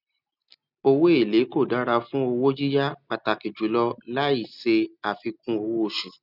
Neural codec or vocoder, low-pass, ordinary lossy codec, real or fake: none; 5.4 kHz; none; real